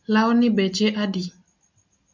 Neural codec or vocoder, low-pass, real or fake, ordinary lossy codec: none; 7.2 kHz; real; MP3, 64 kbps